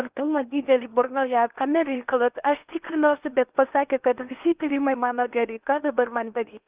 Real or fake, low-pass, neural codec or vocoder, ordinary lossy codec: fake; 3.6 kHz; codec, 16 kHz in and 24 kHz out, 0.8 kbps, FocalCodec, streaming, 65536 codes; Opus, 24 kbps